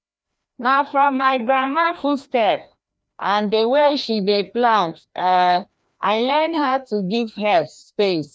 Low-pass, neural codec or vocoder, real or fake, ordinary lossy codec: none; codec, 16 kHz, 1 kbps, FreqCodec, larger model; fake; none